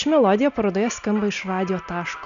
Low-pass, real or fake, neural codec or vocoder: 7.2 kHz; real; none